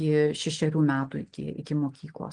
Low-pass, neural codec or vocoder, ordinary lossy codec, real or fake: 9.9 kHz; none; Opus, 24 kbps; real